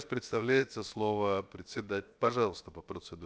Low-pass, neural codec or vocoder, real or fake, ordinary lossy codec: none; codec, 16 kHz, 0.7 kbps, FocalCodec; fake; none